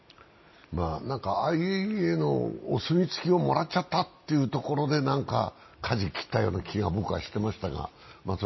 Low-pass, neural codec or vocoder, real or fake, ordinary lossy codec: 7.2 kHz; none; real; MP3, 24 kbps